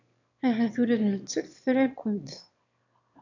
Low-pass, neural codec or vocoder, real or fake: 7.2 kHz; autoencoder, 22.05 kHz, a latent of 192 numbers a frame, VITS, trained on one speaker; fake